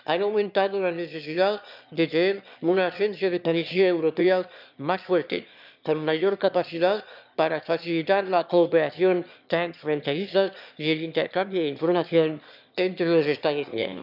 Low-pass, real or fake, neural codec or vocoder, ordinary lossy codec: 5.4 kHz; fake; autoencoder, 22.05 kHz, a latent of 192 numbers a frame, VITS, trained on one speaker; none